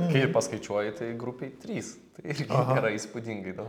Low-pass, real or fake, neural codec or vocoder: 19.8 kHz; real; none